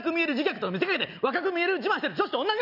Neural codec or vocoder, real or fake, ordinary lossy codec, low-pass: none; real; none; 5.4 kHz